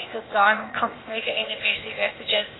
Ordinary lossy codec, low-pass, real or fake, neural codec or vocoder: AAC, 16 kbps; 7.2 kHz; fake; codec, 16 kHz, 0.8 kbps, ZipCodec